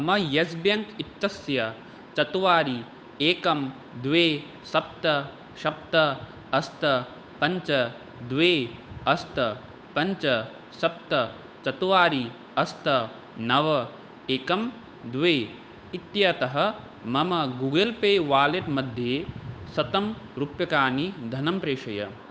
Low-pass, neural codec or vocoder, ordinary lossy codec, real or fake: none; codec, 16 kHz, 8 kbps, FunCodec, trained on Chinese and English, 25 frames a second; none; fake